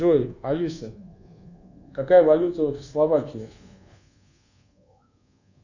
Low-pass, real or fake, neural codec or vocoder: 7.2 kHz; fake; codec, 24 kHz, 1.2 kbps, DualCodec